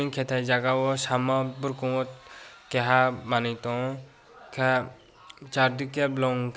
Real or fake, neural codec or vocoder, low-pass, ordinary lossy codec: real; none; none; none